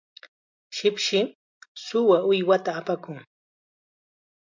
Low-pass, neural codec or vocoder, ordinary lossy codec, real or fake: 7.2 kHz; none; MP3, 64 kbps; real